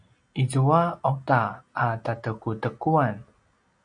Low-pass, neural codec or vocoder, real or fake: 9.9 kHz; none; real